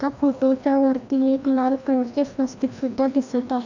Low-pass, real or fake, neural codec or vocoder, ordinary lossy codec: 7.2 kHz; fake; codec, 16 kHz, 1 kbps, FreqCodec, larger model; none